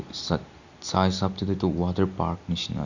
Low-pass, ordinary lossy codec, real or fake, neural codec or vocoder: 7.2 kHz; Opus, 64 kbps; real; none